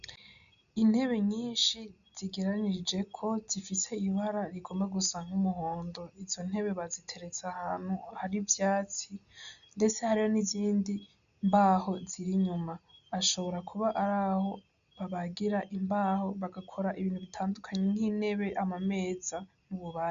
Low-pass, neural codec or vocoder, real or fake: 7.2 kHz; none; real